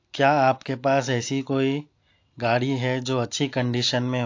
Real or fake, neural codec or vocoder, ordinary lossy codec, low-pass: real; none; AAC, 48 kbps; 7.2 kHz